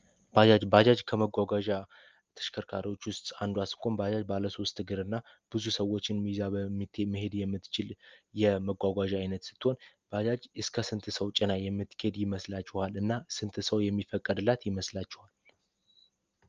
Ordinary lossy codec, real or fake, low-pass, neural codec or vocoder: Opus, 24 kbps; real; 7.2 kHz; none